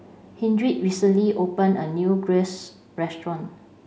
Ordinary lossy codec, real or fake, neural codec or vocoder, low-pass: none; real; none; none